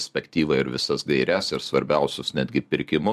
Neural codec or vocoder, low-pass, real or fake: none; 14.4 kHz; real